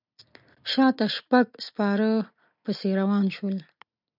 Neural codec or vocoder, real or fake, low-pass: none; real; 5.4 kHz